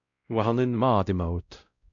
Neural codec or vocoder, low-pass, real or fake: codec, 16 kHz, 0.5 kbps, X-Codec, WavLM features, trained on Multilingual LibriSpeech; 7.2 kHz; fake